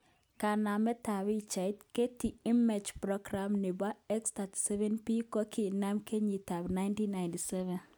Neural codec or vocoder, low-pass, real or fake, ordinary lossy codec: none; none; real; none